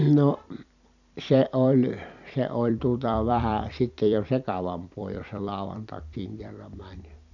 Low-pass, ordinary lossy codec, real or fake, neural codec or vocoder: 7.2 kHz; none; fake; vocoder, 44.1 kHz, 128 mel bands every 256 samples, BigVGAN v2